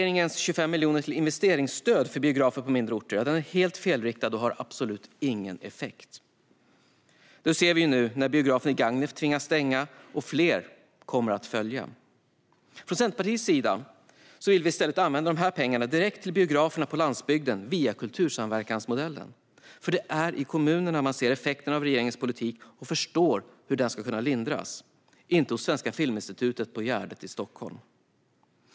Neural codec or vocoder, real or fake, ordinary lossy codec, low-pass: none; real; none; none